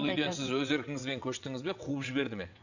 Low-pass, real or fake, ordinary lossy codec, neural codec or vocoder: 7.2 kHz; fake; none; vocoder, 22.05 kHz, 80 mel bands, WaveNeXt